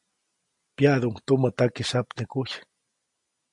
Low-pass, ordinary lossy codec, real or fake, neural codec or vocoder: 10.8 kHz; MP3, 64 kbps; real; none